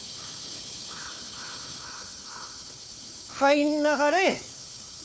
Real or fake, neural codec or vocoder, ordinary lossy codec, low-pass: fake; codec, 16 kHz, 4 kbps, FunCodec, trained on Chinese and English, 50 frames a second; none; none